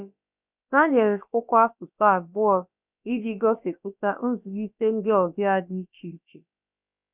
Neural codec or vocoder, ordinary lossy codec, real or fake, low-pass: codec, 16 kHz, about 1 kbps, DyCAST, with the encoder's durations; none; fake; 3.6 kHz